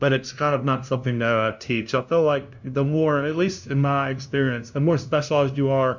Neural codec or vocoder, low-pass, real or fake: codec, 16 kHz, 0.5 kbps, FunCodec, trained on LibriTTS, 25 frames a second; 7.2 kHz; fake